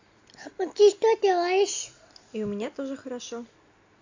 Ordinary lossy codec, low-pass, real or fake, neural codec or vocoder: AAC, 48 kbps; 7.2 kHz; real; none